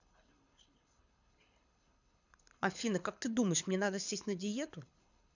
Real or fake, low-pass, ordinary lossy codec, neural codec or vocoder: fake; 7.2 kHz; none; codec, 24 kHz, 6 kbps, HILCodec